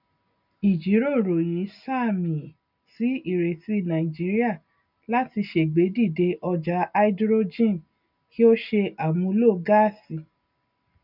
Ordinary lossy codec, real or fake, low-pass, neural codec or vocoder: none; real; 5.4 kHz; none